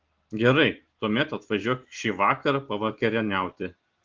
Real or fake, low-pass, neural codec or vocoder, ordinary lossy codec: real; 7.2 kHz; none; Opus, 16 kbps